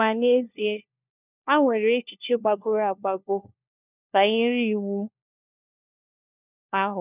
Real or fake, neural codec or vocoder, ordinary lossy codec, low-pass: fake; codec, 16 kHz, 1 kbps, FunCodec, trained on LibriTTS, 50 frames a second; none; 3.6 kHz